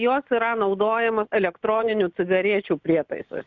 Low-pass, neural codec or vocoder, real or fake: 7.2 kHz; none; real